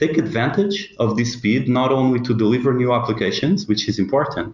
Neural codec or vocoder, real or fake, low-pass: none; real; 7.2 kHz